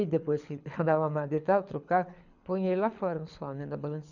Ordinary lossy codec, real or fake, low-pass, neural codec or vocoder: none; fake; 7.2 kHz; codec, 24 kHz, 6 kbps, HILCodec